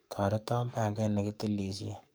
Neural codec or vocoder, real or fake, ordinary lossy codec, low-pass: codec, 44.1 kHz, 7.8 kbps, DAC; fake; none; none